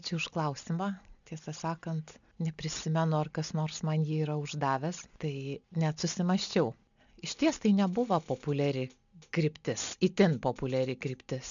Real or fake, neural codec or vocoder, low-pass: real; none; 7.2 kHz